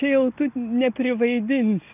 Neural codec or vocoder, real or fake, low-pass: none; real; 3.6 kHz